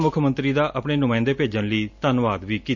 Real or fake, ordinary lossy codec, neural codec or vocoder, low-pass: real; none; none; 7.2 kHz